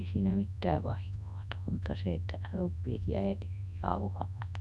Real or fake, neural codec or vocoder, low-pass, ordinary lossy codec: fake; codec, 24 kHz, 0.9 kbps, WavTokenizer, large speech release; none; none